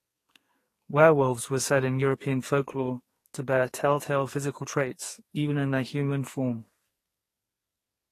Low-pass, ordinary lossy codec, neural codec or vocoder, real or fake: 14.4 kHz; AAC, 48 kbps; codec, 44.1 kHz, 2.6 kbps, SNAC; fake